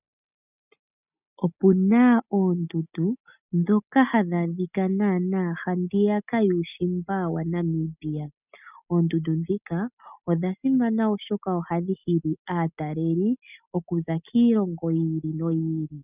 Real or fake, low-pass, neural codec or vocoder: real; 3.6 kHz; none